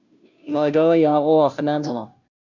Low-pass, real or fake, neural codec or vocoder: 7.2 kHz; fake; codec, 16 kHz, 0.5 kbps, FunCodec, trained on Chinese and English, 25 frames a second